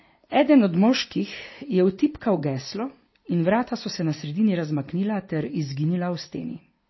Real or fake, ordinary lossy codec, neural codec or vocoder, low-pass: real; MP3, 24 kbps; none; 7.2 kHz